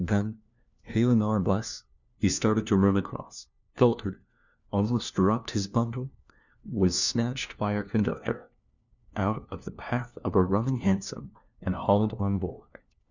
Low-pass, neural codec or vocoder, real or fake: 7.2 kHz; codec, 16 kHz, 1 kbps, FunCodec, trained on LibriTTS, 50 frames a second; fake